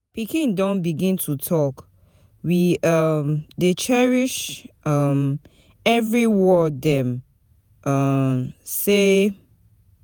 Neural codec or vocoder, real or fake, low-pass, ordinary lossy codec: vocoder, 48 kHz, 128 mel bands, Vocos; fake; none; none